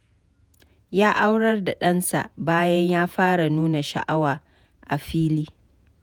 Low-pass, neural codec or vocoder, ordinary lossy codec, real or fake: none; vocoder, 48 kHz, 128 mel bands, Vocos; none; fake